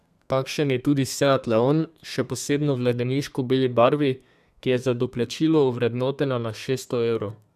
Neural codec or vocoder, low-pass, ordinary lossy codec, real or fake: codec, 32 kHz, 1.9 kbps, SNAC; 14.4 kHz; none; fake